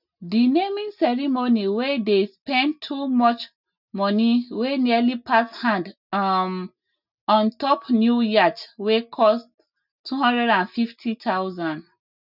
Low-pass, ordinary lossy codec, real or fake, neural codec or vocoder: 5.4 kHz; none; real; none